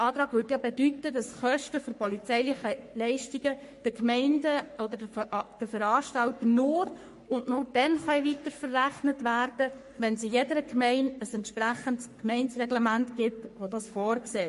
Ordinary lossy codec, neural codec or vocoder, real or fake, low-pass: MP3, 48 kbps; codec, 44.1 kHz, 3.4 kbps, Pupu-Codec; fake; 14.4 kHz